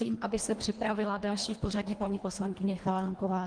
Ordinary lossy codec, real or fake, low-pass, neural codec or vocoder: Opus, 24 kbps; fake; 9.9 kHz; codec, 24 kHz, 1.5 kbps, HILCodec